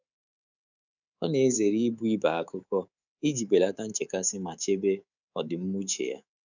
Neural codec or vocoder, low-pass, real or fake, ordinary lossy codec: codec, 24 kHz, 3.1 kbps, DualCodec; 7.2 kHz; fake; none